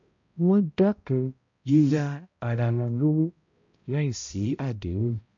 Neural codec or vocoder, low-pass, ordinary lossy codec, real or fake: codec, 16 kHz, 0.5 kbps, X-Codec, HuBERT features, trained on balanced general audio; 7.2 kHz; MP3, 48 kbps; fake